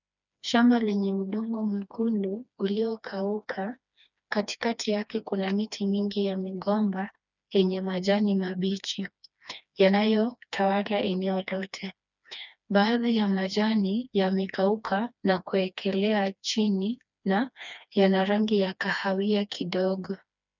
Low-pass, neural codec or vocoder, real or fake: 7.2 kHz; codec, 16 kHz, 2 kbps, FreqCodec, smaller model; fake